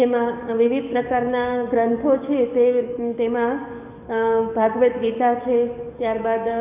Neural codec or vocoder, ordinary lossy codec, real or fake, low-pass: codec, 44.1 kHz, 7.8 kbps, DAC; AAC, 32 kbps; fake; 3.6 kHz